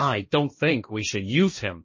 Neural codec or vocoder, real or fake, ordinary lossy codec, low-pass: codec, 16 kHz, 1.1 kbps, Voila-Tokenizer; fake; MP3, 32 kbps; 7.2 kHz